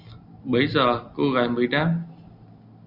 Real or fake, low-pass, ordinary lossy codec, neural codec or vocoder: real; 5.4 kHz; Opus, 64 kbps; none